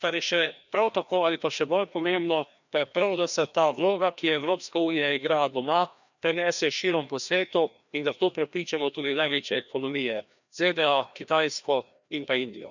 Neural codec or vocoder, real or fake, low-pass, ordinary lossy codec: codec, 16 kHz, 1 kbps, FreqCodec, larger model; fake; 7.2 kHz; none